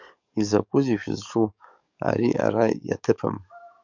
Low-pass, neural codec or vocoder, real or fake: 7.2 kHz; codec, 44.1 kHz, 7.8 kbps, DAC; fake